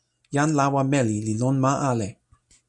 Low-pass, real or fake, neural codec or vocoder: 10.8 kHz; real; none